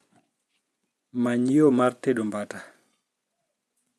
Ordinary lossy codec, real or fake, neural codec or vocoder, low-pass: none; fake; vocoder, 24 kHz, 100 mel bands, Vocos; none